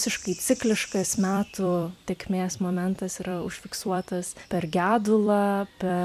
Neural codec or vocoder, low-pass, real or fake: vocoder, 44.1 kHz, 128 mel bands every 256 samples, BigVGAN v2; 14.4 kHz; fake